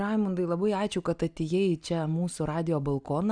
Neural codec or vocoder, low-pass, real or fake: none; 9.9 kHz; real